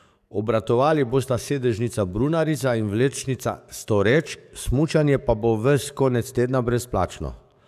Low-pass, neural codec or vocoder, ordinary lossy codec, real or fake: 14.4 kHz; codec, 44.1 kHz, 7.8 kbps, DAC; none; fake